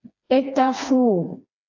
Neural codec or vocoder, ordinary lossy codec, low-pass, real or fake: codec, 16 kHz, 2 kbps, FreqCodec, smaller model; AAC, 48 kbps; 7.2 kHz; fake